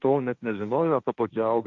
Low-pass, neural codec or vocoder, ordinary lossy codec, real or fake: 7.2 kHz; codec, 16 kHz, 1.1 kbps, Voila-Tokenizer; Opus, 64 kbps; fake